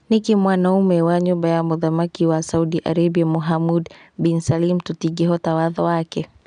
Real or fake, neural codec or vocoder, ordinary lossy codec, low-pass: real; none; none; 9.9 kHz